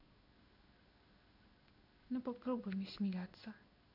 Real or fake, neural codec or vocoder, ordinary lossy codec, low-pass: fake; codec, 16 kHz in and 24 kHz out, 1 kbps, XY-Tokenizer; none; 5.4 kHz